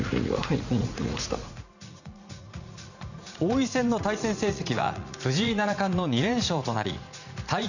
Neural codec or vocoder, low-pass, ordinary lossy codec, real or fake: vocoder, 44.1 kHz, 80 mel bands, Vocos; 7.2 kHz; none; fake